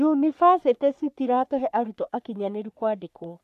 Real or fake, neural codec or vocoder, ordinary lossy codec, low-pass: fake; codec, 44.1 kHz, 3.4 kbps, Pupu-Codec; none; 14.4 kHz